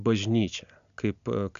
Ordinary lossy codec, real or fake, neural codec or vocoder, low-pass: AAC, 96 kbps; real; none; 7.2 kHz